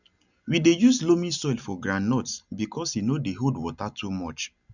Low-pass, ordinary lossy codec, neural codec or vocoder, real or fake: 7.2 kHz; none; none; real